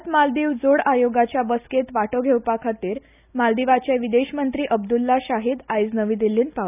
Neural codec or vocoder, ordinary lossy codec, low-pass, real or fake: none; none; 3.6 kHz; real